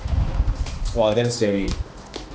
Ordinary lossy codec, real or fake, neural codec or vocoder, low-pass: none; fake; codec, 16 kHz, 2 kbps, X-Codec, HuBERT features, trained on balanced general audio; none